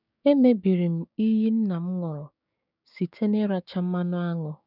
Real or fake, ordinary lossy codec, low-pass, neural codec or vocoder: fake; none; 5.4 kHz; codec, 16 kHz, 6 kbps, DAC